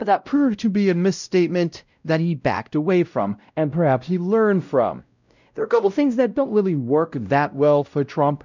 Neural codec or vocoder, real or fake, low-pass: codec, 16 kHz, 0.5 kbps, X-Codec, WavLM features, trained on Multilingual LibriSpeech; fake; 7.2 kHz